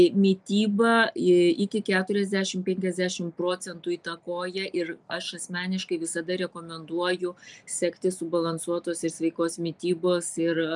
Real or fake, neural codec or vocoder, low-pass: real; none; 10.8 kHz